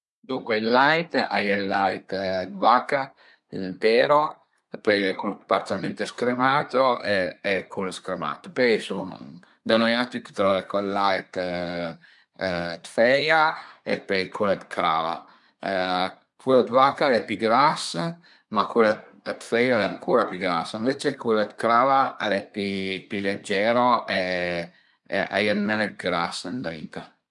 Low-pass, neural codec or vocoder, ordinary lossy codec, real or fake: 10.8 kHz; codec, 24 kHz, 1 kbps, SNAC; none; fake